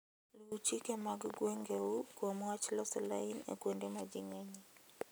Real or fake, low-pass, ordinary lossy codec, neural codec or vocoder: real; none; none; none